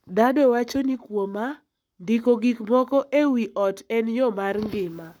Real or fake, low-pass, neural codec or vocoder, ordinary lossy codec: fake; none; codec, 44.1 kHz, 7.8 kbps, Pupu-Codec; none